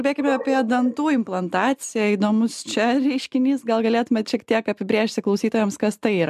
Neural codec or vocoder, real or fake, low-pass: none; real; 14.4 kHz